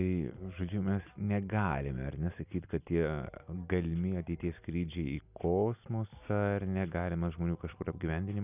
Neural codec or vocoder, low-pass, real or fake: none; 3.6 kHz; real